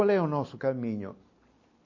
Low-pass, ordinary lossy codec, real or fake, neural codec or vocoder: 7.2 kHz; MP3, 32 kbps; real; none